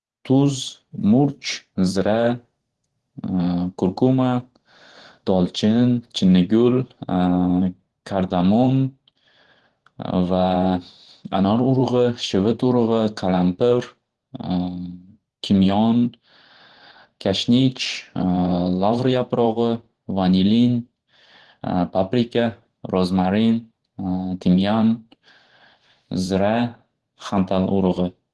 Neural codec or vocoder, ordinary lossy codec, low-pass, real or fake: vocoder, 44.1 kHz, 128 mel bands every 512 samples, BigVGAN v2; Opus, 16 kbps; 10.8 kHz; fake